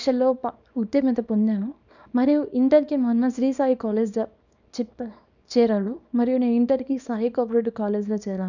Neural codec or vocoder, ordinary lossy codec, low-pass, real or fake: codec, 24 kHz, 0.9 kbps, WavTokenizer, small release; none; 7.2 kHz; fake